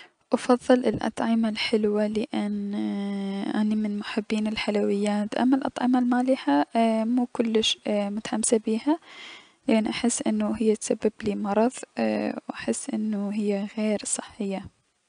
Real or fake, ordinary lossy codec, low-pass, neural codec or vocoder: real; none; 9.9 kHz; none